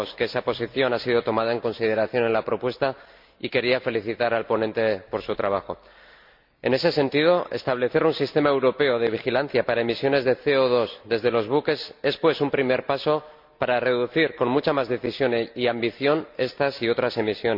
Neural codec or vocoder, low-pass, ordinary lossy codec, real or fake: none; 5.4 kHz; MP3, 48 kbps; real